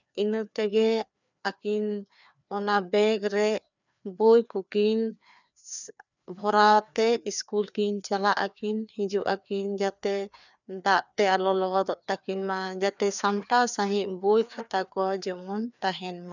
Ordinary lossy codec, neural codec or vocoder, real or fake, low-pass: none; codec, 16 kHz, 2 kbps, FreqCodec, larger model; fake; 7.2 kHz